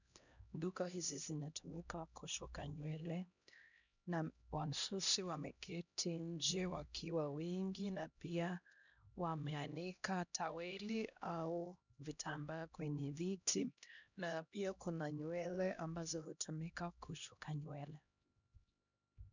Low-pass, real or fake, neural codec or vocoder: 7.2 kHz; fake; codec, 16 kHz, 1 kbps, X-Codec, HuBERT features, trained on LibriSpeech